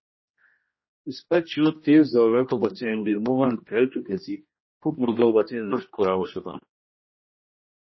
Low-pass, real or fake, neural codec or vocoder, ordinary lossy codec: 7.2 kHz; fake; codec, 16 kHz, 1 kbps, X-Codec, HuBERT features, trained on general audio; MP3, 24 kbps